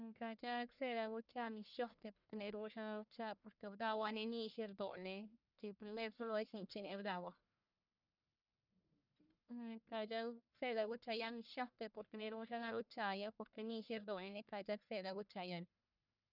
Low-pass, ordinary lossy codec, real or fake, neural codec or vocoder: 5.4 kHz; none; fake; codec, 44.1 kHz, 1.7 kbps, Pupu-Codec